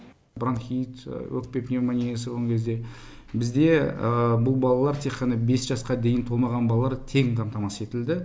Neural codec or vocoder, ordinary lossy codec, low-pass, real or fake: none; none; none; real